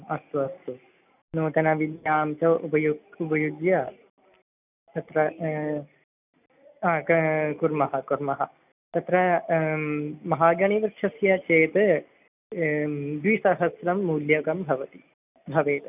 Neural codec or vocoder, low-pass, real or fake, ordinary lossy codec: none; 3.6 kHz; real; none